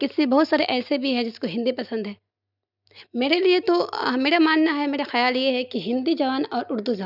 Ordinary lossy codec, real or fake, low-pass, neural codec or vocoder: none; real; 5.4 kHz; none